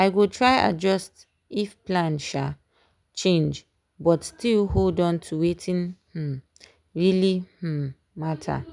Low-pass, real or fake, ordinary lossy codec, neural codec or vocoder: 10.8 kHz; real; none; none